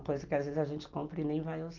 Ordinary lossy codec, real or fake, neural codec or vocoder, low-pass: Opus, 32 kbps; real; none; 7.2 kHz